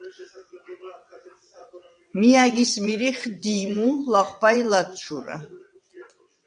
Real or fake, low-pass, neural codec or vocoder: fake; 9.9 kHz; vocoder, 22.05 kHz, 80 mel bands, WaveNeXt